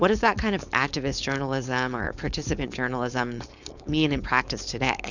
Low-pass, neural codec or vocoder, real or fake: 7.2 kHz; codec, 16 kHz, 4.8 kbps, FACodec; fake